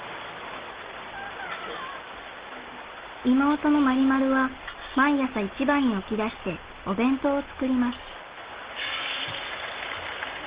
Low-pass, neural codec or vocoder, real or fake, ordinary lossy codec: 3.6 kHz; none; real; Opus, 16 kbps